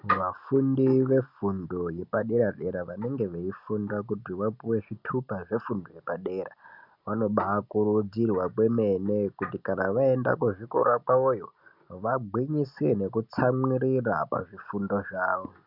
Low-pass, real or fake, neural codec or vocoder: 5.4 kHz; real; none